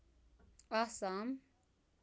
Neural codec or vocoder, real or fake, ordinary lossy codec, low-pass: none; real; none; none